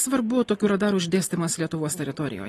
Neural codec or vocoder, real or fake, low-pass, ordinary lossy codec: none; real; 19.8 kHz; AAC, 32 kbps